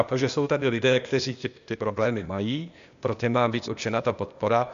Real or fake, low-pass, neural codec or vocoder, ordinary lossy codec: fake; 7.2 kHz; codec, 16 kHz, 0.8 kbps, ZipCodec; MP3, 64 kbps